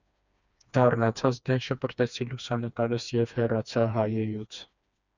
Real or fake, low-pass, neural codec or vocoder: fake; 7.2 kHz; codec, 16 kHz, 2 kbps, FreqCodec, smaller model